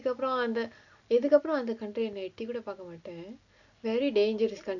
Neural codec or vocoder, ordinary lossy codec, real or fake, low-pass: none; none; real; 7.2 kHz